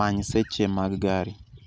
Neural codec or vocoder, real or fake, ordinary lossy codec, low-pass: none; real; none; none